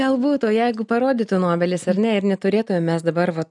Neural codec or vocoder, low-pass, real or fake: none; 10.8 kHz; real